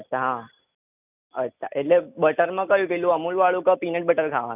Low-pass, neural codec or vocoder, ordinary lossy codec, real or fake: 3.6 kHz; none; none; real